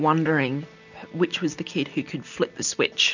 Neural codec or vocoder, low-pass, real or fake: none; 7.2 kHz; real